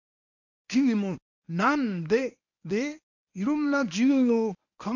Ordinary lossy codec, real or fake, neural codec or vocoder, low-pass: none; fake; codec, 24 kHz, 0.9 kbps, WavTokenizer, medium speech release version 1; 7.2 kHz